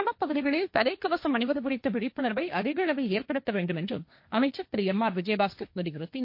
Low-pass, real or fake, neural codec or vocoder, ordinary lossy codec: 5.4 kHz; fake; codec, 16 kHz, 1.1 kbps, Voila-Tokenizer; MP3, 32 kbps